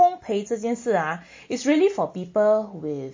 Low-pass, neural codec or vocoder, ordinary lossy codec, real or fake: 7.2 kHz; none; MP3, 32 kbps; real